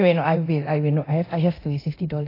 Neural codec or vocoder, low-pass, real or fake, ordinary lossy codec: codec, 24 kHz, 0.9 kbps, DualCodec; 5.4 kHz; fake; AAC, 24 kbps